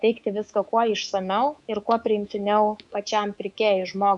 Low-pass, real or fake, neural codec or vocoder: 10.8 kHz; fake; codec, 24 kHz, 3.1 kbps, DualCodec